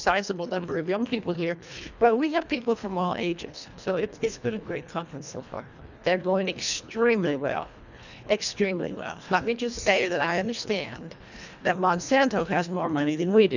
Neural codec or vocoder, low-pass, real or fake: codec, 24 kHz, 1.5 kbps, HILCodec; 7.2 kHz; fake